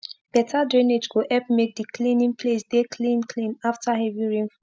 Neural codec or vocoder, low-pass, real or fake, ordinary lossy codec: none; none; real; none